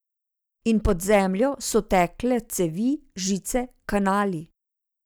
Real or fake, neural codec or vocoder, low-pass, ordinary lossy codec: real; none; none; none